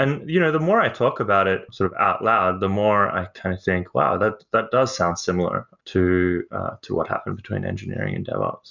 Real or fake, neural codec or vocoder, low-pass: real; none; 7.2 kHz